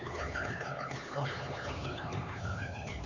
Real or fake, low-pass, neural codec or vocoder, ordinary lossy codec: fake; 7.2 kHz; codec, 16 kHz, 4 kbps, X-Codec, HuBERT features, trained on LibriSpeech; none